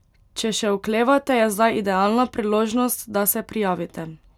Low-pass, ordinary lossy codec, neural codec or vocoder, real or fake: 19.8 kHz; none; none; real